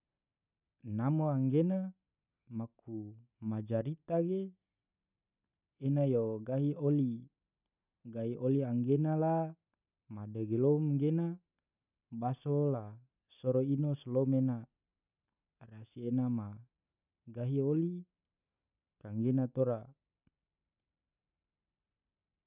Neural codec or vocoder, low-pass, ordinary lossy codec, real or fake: none; 3.6 kHz; none; real